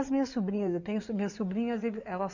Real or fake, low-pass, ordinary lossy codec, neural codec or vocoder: fake; 7.2 kHz; none; codec, 16 kHz in and 24 kHz out, 2.2 kbps, FireRedTTS-2 codec